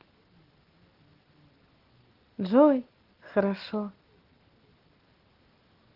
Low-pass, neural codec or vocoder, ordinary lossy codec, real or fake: 5.4 kHz; none; Opus, 16 kbps; real